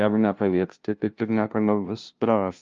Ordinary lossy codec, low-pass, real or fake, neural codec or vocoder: Opus, 24 kbps; 7.2 kHz; fake; codec, 16 kHz, 0.5 kbps, FunCodec, trained on LibriTTS, 25 frames a second